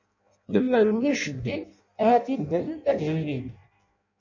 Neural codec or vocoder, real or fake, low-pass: codec, 16 kHz in and 24 kHz out, 0.6 kbps, FireRedTTS-2 codec; fake; 7.2 kHz